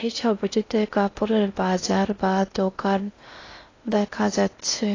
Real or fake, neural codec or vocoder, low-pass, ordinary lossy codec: fake; codec, 16 kHz in and 24 kHz out, 0.6 kbps, FocalCodec, streaming, 4096 codes; 7.2 kHz; AAC, 32 kbps